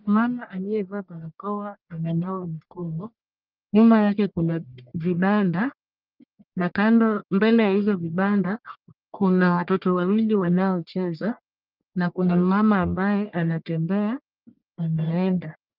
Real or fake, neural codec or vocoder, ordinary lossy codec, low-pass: fake; codec, 44.1 kHz, 1.7 kbps, Pupu-Codec; Opus, 24 kbps; 5.4 kHz